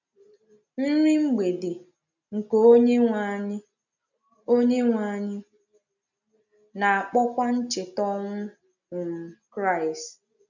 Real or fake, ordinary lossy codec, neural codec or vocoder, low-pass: real; none; none; 7.2 kHz